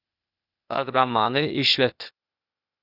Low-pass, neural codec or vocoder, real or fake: 5.4 kHz; codec, 16 kHz, 0.8 kbps, ZipCodec; fake